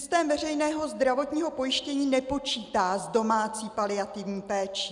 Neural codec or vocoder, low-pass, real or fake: none; 10.8 kHz; real